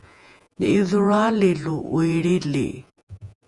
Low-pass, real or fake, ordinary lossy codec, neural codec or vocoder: 10.8 kHz; fake; Opus, 64 kbps; vocoder, 48 kHz, 128 mel bands, Vocos